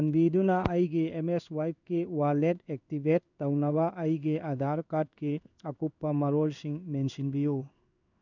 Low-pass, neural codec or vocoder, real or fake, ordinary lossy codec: 7.2 kHz; codec, 16 kHz in and 24 kHz out, 1 kbps, XY-Tokenizer; fake; none